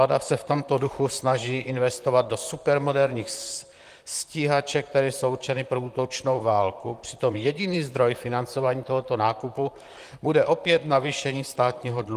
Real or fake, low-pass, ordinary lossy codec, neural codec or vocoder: fake; 14.4 kHz; Opus, 32 kbps; vocoder, 44.1 kHz, 128 mel bands, Pupu-Vocoder